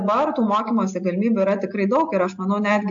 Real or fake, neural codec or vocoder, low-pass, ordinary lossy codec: real; none; 7.2 kHz; AAC, 64 kbps